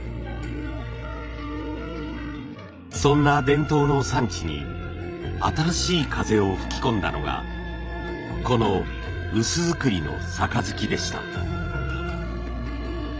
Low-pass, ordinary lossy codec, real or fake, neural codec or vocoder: none; none; fake; codec, 16 kHz, 16 kbps, FreqCodec, larger model